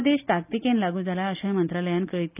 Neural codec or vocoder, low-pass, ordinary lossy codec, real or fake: none; 3.6 kHz; none; real